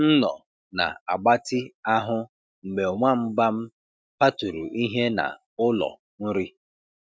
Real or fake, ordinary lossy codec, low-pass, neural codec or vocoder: real; none; none; none